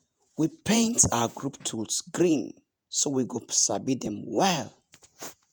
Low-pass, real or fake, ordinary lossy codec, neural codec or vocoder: none; fake; none; vocoder, 48 kHz, 128 mel bands, Vocos